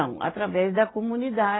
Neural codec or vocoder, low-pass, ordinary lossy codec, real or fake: none; 7.2 kHz; AAC, 16 kbps; real